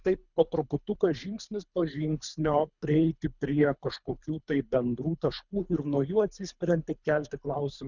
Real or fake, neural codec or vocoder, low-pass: fake; codec, 24 kHz, 3 kbps, HILCodec; 7.2 kHz